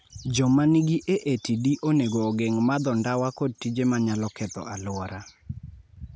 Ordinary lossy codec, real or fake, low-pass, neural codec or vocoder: none; real; none; none